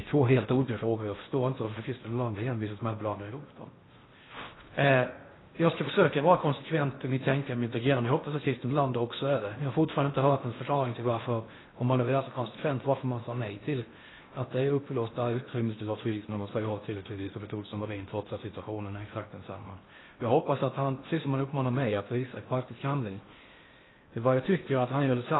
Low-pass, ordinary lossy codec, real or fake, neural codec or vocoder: 7.2 kHz; AAC, 16 kbps; fake; codec, 16 kHz in and 24 kHz out, 0.6 kbps, FocalCodec, streaming, 4096 codes